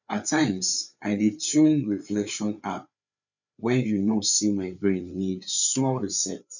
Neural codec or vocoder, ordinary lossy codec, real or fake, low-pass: codec, 16 kHz, 4 kbps, FreqCodec, larger model; none; fake; 7.2 kHz